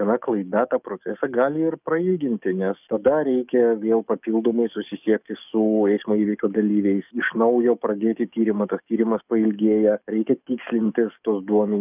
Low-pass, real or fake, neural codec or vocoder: 3.6 kHz; fake; codec, 44.1 kHz, 7.8 kbps, DAC